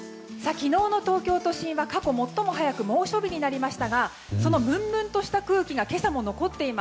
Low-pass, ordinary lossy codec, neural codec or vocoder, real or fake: none; none; none; real